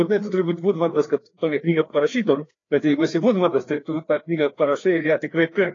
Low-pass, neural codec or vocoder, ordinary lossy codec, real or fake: 7.2 kHz; codec, 16 kHz, 2 kbps, FreqCodec, larger model; AAC, 32 kbps; fake